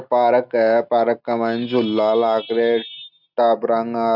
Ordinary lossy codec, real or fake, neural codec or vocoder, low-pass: none; real; none; 5.4 kHz